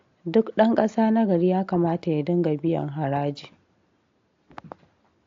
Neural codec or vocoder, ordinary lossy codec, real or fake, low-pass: none; AAC, 48 kbps; real; 7.2 kHz